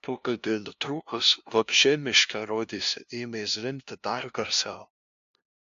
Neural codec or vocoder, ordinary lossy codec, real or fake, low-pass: codec, 16 kHz, 0.5 kbps, FunCodec, trained on LibriTTS, 25 frames a second; AAC, 64 kbps; fake; 7.2 kHz